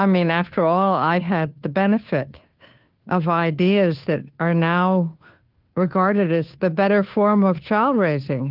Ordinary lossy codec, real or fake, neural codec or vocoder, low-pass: Opus, 32 kbps; fake; codec, 16 kHz, 2 kbps, FunCodec, trained on Chinese and English, 25 frames a second; 5.4 kHz